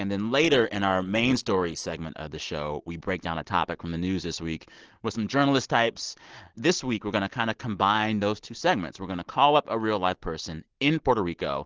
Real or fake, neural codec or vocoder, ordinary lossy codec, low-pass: real; none; Opus, 16 kbps; 7.2 kHz